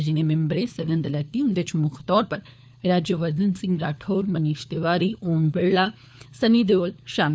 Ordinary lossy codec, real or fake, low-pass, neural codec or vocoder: none; fake; none; codec, 16 kHz, 4 kbps, FunCodec, trained on LibriTTS, 50 frames a second